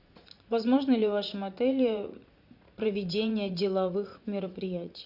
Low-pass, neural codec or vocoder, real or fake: 5.4 kHz; vocoder, 44.1 kHz, 80 mel bands, Vocos; fake